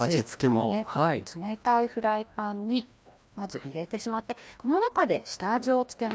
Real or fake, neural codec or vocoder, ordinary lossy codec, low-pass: fake; codec, 16 kHz, 1 kbps, FreqCodec, larger model; none; none